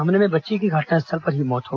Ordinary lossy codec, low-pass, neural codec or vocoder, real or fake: Opus, 24 kbps; 7.2 kHz; none; real